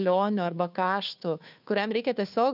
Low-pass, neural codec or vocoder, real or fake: 5.4 kHz; codec, 16 kHz, 2 kbps, FunCodec, trained on Chinese and English, 25 frames a second; fake